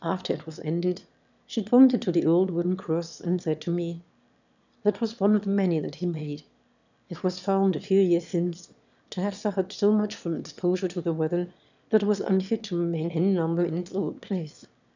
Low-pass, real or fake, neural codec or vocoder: 7.2 kHz; fake; autoencoder, 22.05 kHz, a latent of 192 numbers a frame, VITS, trained on one speaker